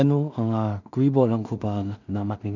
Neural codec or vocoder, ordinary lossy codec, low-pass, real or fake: codec, 16 kHz in and 24 kHz out, 0.4 kbps, LongCat-Audio-Codec, two codebook decoder; none; 7.2 kHz; fake